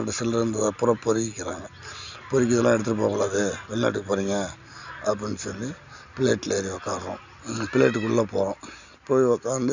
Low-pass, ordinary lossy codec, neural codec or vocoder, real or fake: 7.2 kHz; none; none; real